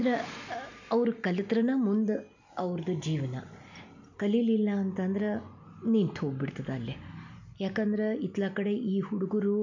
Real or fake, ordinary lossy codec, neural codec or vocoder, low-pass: real; none; none; 7.2 kHz